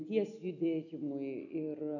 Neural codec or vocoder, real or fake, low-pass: none; real; 7.2 kHz